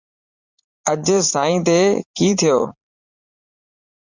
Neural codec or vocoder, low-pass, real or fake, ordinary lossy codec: vocoder, 44.1 kHz, 80 mel bands, Vocos; 7.2 kHz; fake; Opus, 64 kbps